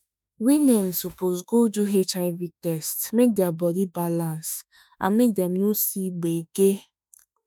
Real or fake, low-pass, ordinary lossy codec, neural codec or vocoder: fake; none; none; autoencoder, 48 kHz, 32 numbers a frame, DAC-VAE, trained on Japanese speech